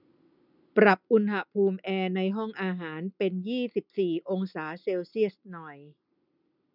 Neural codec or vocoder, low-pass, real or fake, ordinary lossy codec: none; 5.4 kHz; real; none